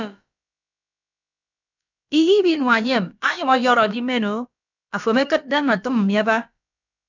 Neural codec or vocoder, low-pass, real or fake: codec, 16 kHz, about 1 kbps, DyCAST, with the encoder's durations; 7.2 kHz; fake